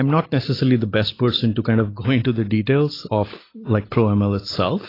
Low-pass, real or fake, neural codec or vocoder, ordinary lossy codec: 5.4 kHz; real; none; AAC, 24 kbps